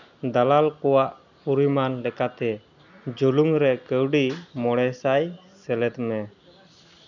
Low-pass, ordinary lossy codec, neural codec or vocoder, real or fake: 7.2 kHz; none; none; real